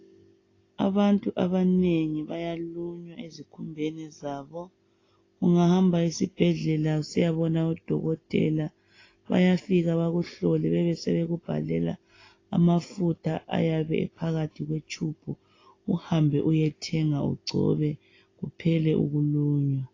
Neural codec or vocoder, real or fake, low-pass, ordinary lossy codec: none; real; 7.2 kHz; AAC, 32 kbps